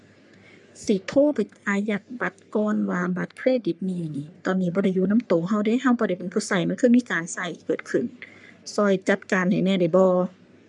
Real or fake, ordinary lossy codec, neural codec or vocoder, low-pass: fake; none; codec, 44.1 kHz, 3.4 kbps, Pupu-Codec; 10.8 kHz